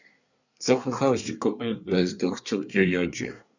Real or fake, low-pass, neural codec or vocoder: fake; 7.2 kHz; codec, 24 kHz, 1 kbps, SNAC